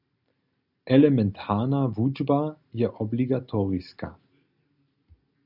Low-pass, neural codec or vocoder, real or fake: 5.4 kHz; none; real